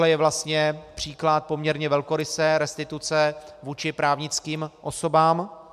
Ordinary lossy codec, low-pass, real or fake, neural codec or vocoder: MP3, 96 kbps; 14.4 kHz; real; none